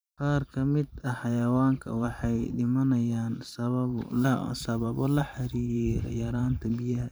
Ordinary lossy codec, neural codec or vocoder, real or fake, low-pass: none; vocoder, 44.1 kHz, 128 mel bands every 512 samples, BigVGAN v2; fake; none